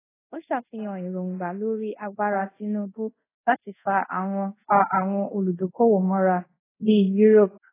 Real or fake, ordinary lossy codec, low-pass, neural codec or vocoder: fake; AAC, 16 kbps; 3.6 kHz; codec, 24 kHz, 0.5 kbps, DualCodec